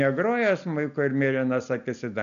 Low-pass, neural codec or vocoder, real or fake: 7.2 kHz; none; real